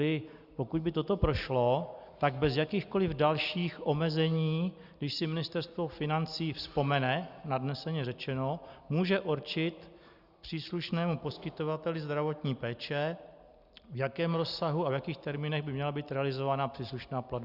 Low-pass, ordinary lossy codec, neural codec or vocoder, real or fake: 5.4 kHz; Opus, 64 kbps; none; real